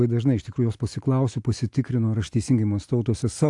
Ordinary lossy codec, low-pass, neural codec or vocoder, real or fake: AAC, 64 kbps; 10.8 kHz; none; real